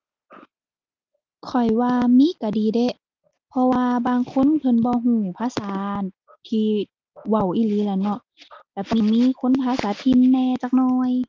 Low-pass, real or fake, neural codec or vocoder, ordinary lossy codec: 7.2 kHz; real; none; Opus, 24 kbps